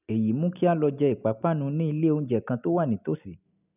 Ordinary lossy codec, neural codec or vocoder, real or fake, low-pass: none; none; real; 3.6 kHz